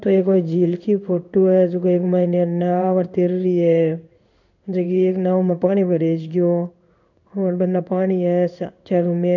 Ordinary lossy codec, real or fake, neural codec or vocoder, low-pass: none; fake; codec, 16 kHz in and 24 kHz out, 1 kbps, XY-Tokenizer; 7.2 kHz